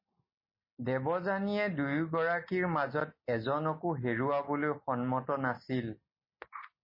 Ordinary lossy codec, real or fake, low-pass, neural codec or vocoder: MP3, 24 kbps; real; 5.4 kHz; none